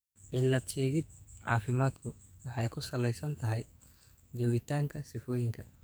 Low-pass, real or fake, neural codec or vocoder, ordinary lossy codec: none; fake; codec, 44.1 kHz, 2.6 kbps, SNAC; none